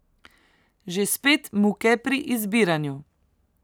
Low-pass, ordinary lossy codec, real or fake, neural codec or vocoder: none; none; real; none